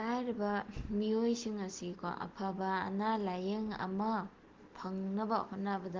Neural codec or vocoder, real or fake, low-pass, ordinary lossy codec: none; real; 7.2 kHz; Opus, 16 kbps